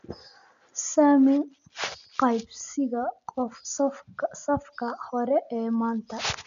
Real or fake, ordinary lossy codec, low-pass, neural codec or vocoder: real; none; 7.2 kHz; none